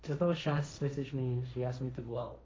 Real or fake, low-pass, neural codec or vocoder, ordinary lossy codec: fake; none; codec, 16 kHz, 1.1 kbps, Voila-Tokenizer; none